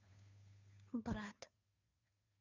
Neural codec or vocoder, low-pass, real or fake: codec, 16 kHz, 2 kbps, FunCodec, trained on Chinese and English, 25 frames a second; 7.2 kHz; fake